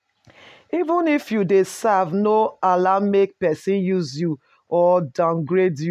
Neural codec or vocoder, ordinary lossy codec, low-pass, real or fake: none; none; 14.4 kHz; real